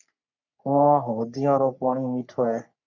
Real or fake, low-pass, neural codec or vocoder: fake; 7.2 kHz; codec, 44.1 kHz, 3.4 kbps, Pupu-Codec